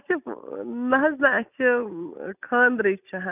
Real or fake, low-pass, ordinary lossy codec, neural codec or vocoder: real; 3.6 kHz; none; none